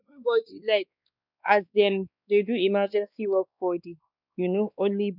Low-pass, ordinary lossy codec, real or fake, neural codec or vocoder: 5.4 kHz; none; fake; codec, 16 kHz, 2 kbps, X-Codec, WavLM features, trained on Multilingual LibriSpeech